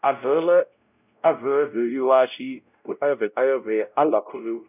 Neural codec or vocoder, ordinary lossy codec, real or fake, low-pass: codec, 16 kHz, 0.5 kbps, X-Codec, WavLM features, trained on Multilingual LibriSpeech; none; fake; 3.6 kHz